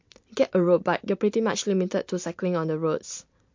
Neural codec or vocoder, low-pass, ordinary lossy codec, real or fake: none; 7.2 kHz; MP3, 48 kbps; real